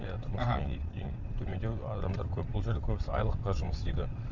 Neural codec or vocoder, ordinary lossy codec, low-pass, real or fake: vocoder, 22.05 kHz, 80 mel bands, WaveNeXt; none; 7.2 kHz; fake